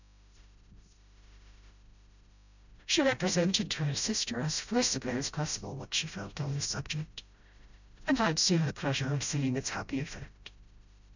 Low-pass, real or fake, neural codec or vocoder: 7.2 kHz; fake; codec, 16 kHz, 0.5 kbps, FreqCodec, smaller model